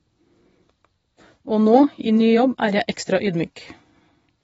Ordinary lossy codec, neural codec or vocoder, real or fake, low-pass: AAC, 24 kbps; vocoder, 24 kHz, 100 mel bands, Vocos; fake; 10.8 kHz